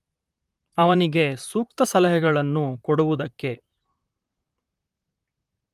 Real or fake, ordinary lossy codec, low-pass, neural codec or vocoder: fake; Opus, 32 kbps; 14.4 kHz; vocoder, 44.1 kHz, 128 mel bands, Pupu-Vocoder